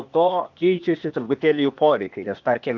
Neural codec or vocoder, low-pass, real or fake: codec, 16 kHz, 0.8 kbps, ZipCodec; 7.2 kHz; fake